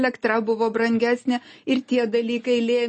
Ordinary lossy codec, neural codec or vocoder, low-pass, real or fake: MP3, 32 kbps; none; 10.8 kHz; real